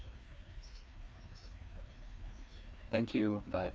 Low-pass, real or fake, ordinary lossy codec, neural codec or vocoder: none; fake; none; codec, 16 kHz, 2 kbps, FreqCodec, larger model